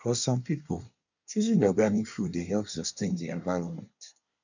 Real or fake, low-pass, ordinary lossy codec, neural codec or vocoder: fake; 7.2 kHz; none; codec, 24 kHz, 1 kbps, SNAC